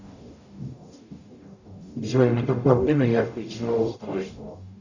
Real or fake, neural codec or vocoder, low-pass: fake; codec, 44.1 kHz, 0.9 kbps, DAC; 7.2 kHz